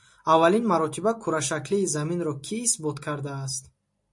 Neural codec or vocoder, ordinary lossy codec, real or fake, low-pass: none; MP3, 48 kbps; real; 10.8 kHz